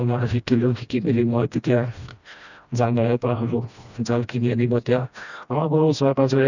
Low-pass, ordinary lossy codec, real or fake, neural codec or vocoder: 7.2 kHz; none; fake; codec, 16 kHz, 1 kbps, FreqCodec, smaller model